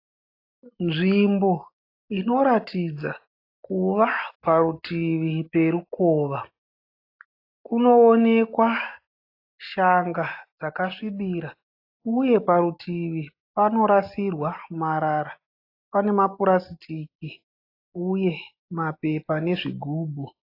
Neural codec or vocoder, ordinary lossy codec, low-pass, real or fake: none; AAC, 32 kbps; 5.4 kHz; real